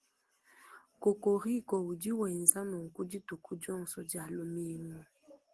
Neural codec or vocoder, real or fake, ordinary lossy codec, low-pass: none; real; Opus, 16 kbps; 10.8 kHz